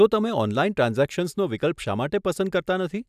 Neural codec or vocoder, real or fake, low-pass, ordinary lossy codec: none; real; 14.4 kHz; none